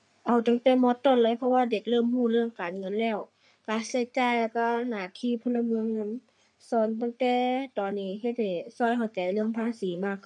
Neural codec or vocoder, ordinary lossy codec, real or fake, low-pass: codec, 44.1 kHz, 3.4 kbps, Pupu-Codec; none; fake; 10.8 kHz